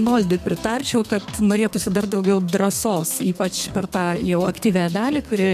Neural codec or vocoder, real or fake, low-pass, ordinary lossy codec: codec, 32 kHz, 1.9 kbps, SNAC; fake; 14.4 kHz; MP3, 96 kbps